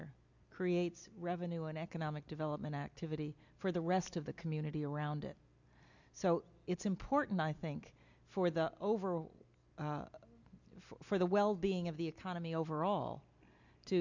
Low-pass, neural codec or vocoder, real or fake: 7.2 kHz; none; real